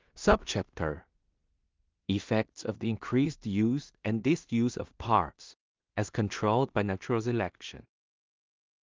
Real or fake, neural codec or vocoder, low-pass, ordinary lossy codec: fake; codec, 16 kHz in and 24 kHz out, 0.4 kbps, LongCat-Audio-Codec, two codebook decoder; 7.2 kHz; Opus, 24 kbps